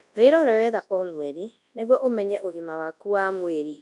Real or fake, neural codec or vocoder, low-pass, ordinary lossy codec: fake; codec, 24 kHz, 0.9 kbps, WavTokenizer, large speech release; 10.8 kHz; MP3, 96 kbps